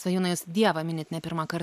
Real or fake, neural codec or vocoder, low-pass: real; none; 14.4 kHz